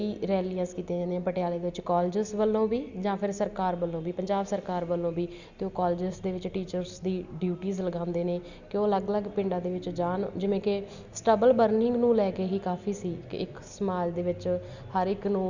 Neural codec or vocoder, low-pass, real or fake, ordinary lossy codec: none; 7.2 kHz; real; Opus, 64 kbps